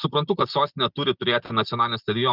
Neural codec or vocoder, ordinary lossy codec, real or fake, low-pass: none; Opus, 24 kbps; real; 5.4 kHz